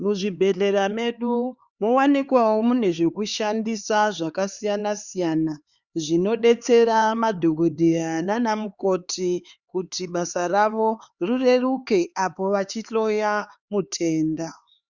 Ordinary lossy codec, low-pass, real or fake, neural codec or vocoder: Opus, 64 kbps; 7.2 kHz; fake; codec, 16 kHz, 4 kbps, X-Codec, HuBERT features, trained on LibriSpeech